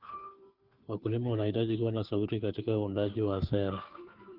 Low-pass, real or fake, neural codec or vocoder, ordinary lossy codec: 5.4 kHz; fake; codec, 16 kHz, 2 kbps, FunCodec, trained on Chinese and English, 25 frames a second; Opus, 32 kbps